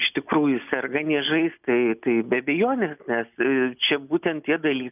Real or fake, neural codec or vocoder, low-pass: real; none; 3.6 kHz